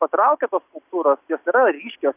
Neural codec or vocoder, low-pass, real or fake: none; 3.6 kHz; real